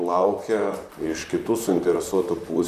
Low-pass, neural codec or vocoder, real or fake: 14.4 kHz; vocoder, 44.1 kHz, 128 mel bands, Pupu-Vocoder; fake